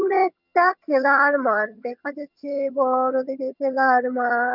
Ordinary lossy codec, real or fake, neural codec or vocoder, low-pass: none; fake; vocoder, 22.05 kHz, 80 mel bands, HiFi-GAN; 5.4 kHz